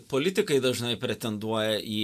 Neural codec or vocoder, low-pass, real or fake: none; 14.4 kHz; real